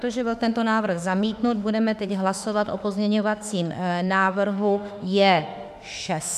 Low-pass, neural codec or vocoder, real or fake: 14.4 kHz; autoencoder, 48 kHz, 32 numbers a frame, DAC-VAE, trained on Japanese speech; fake